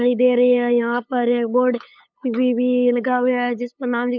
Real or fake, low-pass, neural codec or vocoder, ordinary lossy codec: fake; 7.2 kHz; codec, 16 kHz, 8 kbps, FunCodec, trained on LibriTTS, 25 frames a second; none